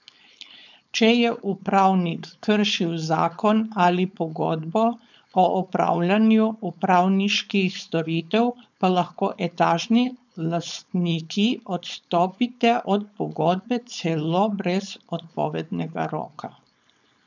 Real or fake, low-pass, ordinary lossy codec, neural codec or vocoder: fake; 7.2 kHz; none; codec, 16 kHz, 4.8 kbps, FACodec